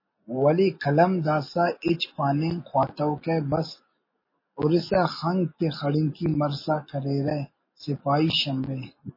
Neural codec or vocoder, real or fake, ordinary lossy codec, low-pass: none; real; MP3, 24 kbps; 5.4 kHz